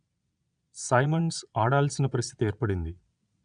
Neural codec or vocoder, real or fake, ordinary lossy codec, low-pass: vocoder, 22.05 kHz, 80 mel bands, Vocos; fake; none; 9.9 kHz